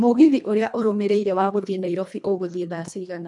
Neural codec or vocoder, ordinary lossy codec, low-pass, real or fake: codec, 24 kHz, 1.5 kbps, HILCodec; none; 10.8 kHz; fake